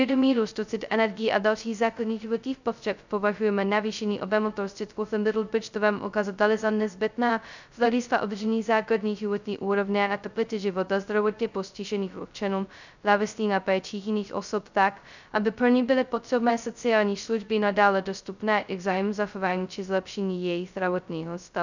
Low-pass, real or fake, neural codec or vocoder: 7.2 kHz; fake; codec, 16 kHz, 0.2 kbps, FocalCodec